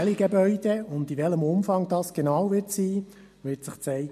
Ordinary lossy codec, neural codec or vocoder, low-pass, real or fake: MP3, 64 kbps; none; 14.4 kHz; real